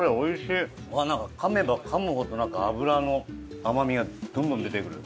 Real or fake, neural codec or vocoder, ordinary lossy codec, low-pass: real; none; none; none